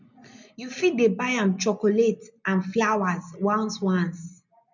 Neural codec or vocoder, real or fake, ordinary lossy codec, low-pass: none; real; none; 7.2 kHz